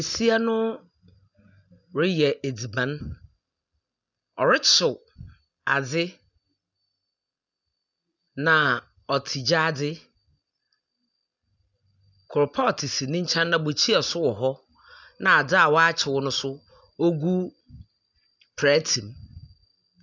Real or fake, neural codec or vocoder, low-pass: real; none; 7.2 kHz